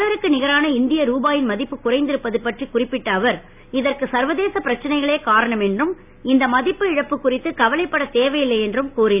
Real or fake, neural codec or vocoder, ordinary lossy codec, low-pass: real; none; none; 3.6 kHz